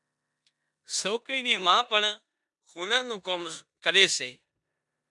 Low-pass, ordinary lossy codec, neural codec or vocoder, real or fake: 10.8 kHz; MP3, 96 kbps; codec, 16 kHz in and 24 kHz out, 0.9 kbps, LongCat-Audio-Codec, four codebook decoder; fake